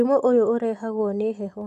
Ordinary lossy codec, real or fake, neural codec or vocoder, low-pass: none; real; none; 14.4 kHz